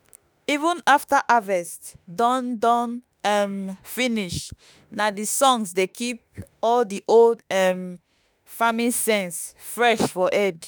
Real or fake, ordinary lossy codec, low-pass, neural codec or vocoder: fake; none; none; autoencoder, 48 kHz, 32 numbers a frame, DAC-VAE, trained on Japanese speech